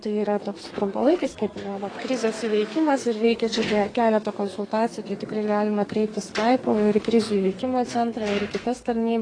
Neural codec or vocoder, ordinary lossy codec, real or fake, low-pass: codec, 32 kHz, 1.9 kbps, SNAC; AAC, 32 kbps; fake; 9.9 kHz